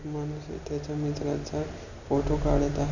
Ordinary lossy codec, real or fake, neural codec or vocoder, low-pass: none; real; none; 7.2 kHz